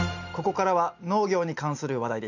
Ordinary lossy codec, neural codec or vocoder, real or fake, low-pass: none; none; real; 7.2 kHz